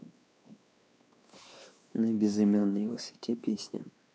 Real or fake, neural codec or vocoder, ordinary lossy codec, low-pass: fake; codec, 16 kHz, 2 kbps, X-Codec, WavLM features, trained on Multilingual LibriSpeech; none; none